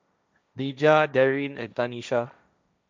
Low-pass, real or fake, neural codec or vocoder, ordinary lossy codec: none; fake; codec, 16 kHz, 1.1 kbps, Voila-Tokenizer; none